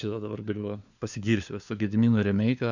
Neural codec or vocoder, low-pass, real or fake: codec, 16 kHz, 4 kbps, FunCodec, trained on LibriTTS, 50 frames a second; 7.2 kHz; fake